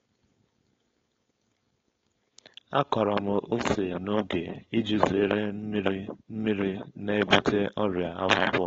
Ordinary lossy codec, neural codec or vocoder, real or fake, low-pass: AAC, 24 kbps; codec, 16 kHz, 4.8 kbps, FACodec; fake; 7.2 kHz